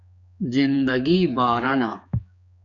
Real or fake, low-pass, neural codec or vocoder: fake; 7.2 kHz; codec, 16 kHz, 4 kbps, X-Codec, HuBERT features, trained on general audio